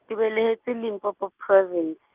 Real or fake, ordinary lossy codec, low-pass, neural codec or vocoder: real; Opus, 24 kbps; 3.6 kHz; none